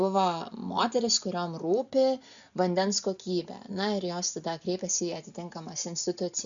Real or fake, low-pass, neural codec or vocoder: real; 7.2 kHz; none